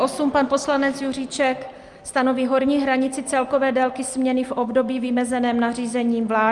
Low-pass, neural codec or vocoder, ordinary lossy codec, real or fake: 10.8 kHz; none; Opus, 32 kbps; real